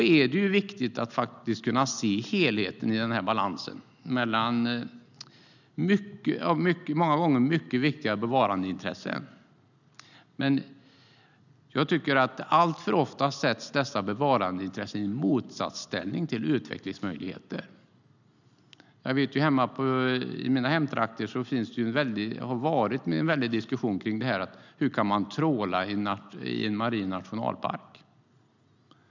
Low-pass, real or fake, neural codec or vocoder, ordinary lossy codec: 7.2 kHz; real; none; none